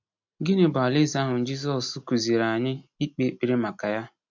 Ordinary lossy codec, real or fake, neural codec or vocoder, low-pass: MP3, 48 kbps; real; none; 7.2 kHz